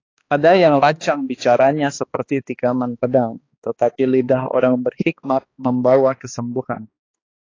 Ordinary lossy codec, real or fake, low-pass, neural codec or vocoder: AAC, 32 kbps; fake; 7.2 kHz; codec, 16 kHz, 2 kbps, X-Codec, HuBERT features, trained on balanced general audio